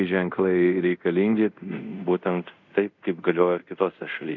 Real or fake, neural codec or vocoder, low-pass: fake; codec, 24 kHz, 0.5 kbps, DualCodec; 7.2 kHz